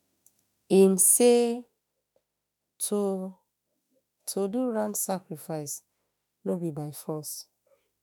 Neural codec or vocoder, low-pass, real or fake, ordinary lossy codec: autoencoder, 48 kHz, 32 numbers a frame, DAC-VAE, trained on Japanese speech; none; fake; none